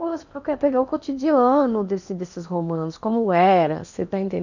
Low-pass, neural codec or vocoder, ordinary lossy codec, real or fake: 7.2 kHz; codec, 16 kHz in and 24 kHz out, 0.8 kbps, FocalCodec, streaming, 65536 codes; none; fake